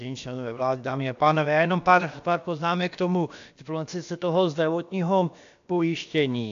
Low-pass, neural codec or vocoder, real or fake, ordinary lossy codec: 7.2 kHz; codec, 16 kHz, about 1 kbps, DyCAST, with the encoder's durations; fake; AAC, 64 kbps